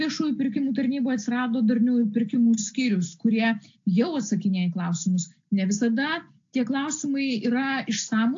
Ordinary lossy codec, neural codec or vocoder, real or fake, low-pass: AAC, 48 kbps; none; real; 7.2 kHz